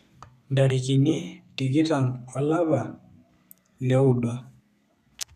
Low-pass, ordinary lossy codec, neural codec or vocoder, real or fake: 14.4 kHz; MP3, 96 kbps; codec, 32 kHz, 1.9 kbps, SNAC; fake